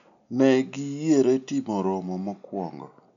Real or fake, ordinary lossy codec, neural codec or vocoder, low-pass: real; none; none; 7.2 kHz